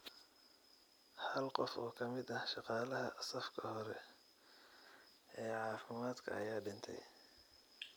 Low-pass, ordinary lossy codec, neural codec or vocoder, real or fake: none; none; none; real